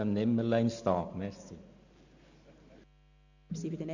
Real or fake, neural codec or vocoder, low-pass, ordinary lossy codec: real; none; 7.2 kHz; none